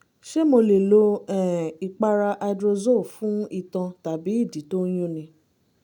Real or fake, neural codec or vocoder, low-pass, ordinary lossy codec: real; none; 19.8 kHz; none